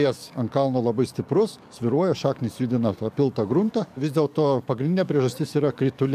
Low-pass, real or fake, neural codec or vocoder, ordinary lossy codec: 14.4 kHz; fake; codec, 44.1 kHz, 7.8 kbps, DAC; AAC, 96 kbps